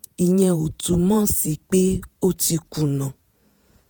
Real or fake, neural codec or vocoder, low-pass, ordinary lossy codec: fake; vocoder, 48 kHz, 128 mel bands, Vocos; none; none